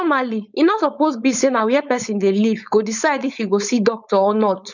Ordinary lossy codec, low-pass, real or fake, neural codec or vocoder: none; 7.2 kHz; fake; codec, 16 kHz, 4.8 kbps, FACodec